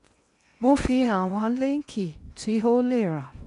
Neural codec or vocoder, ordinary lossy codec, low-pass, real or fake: codec, 16 kHz in and 24 kHz out, 0.8 kbps, FocalCodec, streaming, 65536 codes; MP3, 96 kbps; 10.8 kHz; fake